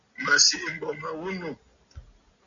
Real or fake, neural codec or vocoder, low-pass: real; none; 7.2 kHz